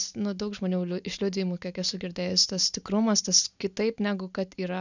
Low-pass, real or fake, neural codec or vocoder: 7.2 kHz; real; none